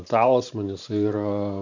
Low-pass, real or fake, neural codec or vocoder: 7.2 kHz; fake; vocoder, 44.1 kHz, 128 mel bands every 512 samples, BigVGAN v2